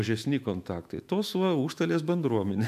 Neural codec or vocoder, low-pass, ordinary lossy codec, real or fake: autoencoder, 48 kHz, 128 numbers a frame, DAC-VAE, trained on Japanese speech; 14.4 kHz; AAC, 96 kbps; fake